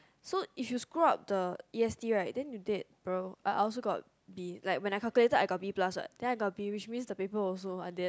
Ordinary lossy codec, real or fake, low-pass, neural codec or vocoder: none; real; none; none